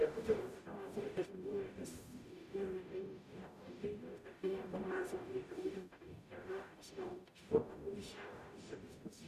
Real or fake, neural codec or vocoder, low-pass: fake; codec, 44.1 kHz, 0.9 kbps, DAC; 14.4 kHz